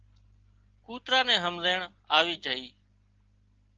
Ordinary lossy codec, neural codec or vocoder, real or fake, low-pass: Opus, 16 kbps; none; real; 7.2 kHz